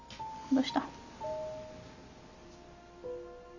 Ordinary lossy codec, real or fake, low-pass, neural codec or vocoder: none; real; 7.2 kHz; none